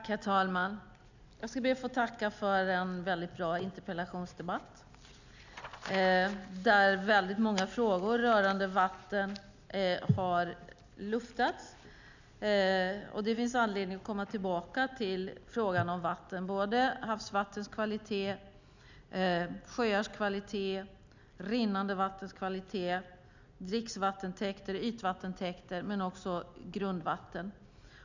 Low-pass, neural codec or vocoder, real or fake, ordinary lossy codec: 7.2 kHz; none; real; none